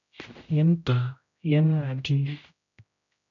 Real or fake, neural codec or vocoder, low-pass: fake; codec, 16 kHz, 0.5 kbps, X-Codec, HuBERT features, trained on general audio; 7.2 kHz